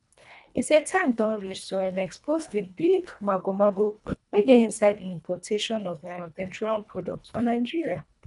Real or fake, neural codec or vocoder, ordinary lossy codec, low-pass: fake; codec, 24 kHz, 1.5 kbps, HILCodec; none; 10.8 kHz